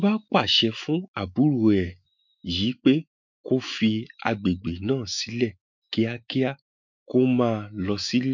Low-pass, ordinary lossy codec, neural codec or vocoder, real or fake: 7.2 kHz; MP3, 64 kbps; autoencoder, 48 kHz, 128 numbers a frame, DAC-VAE, trained on Japanese speech; fake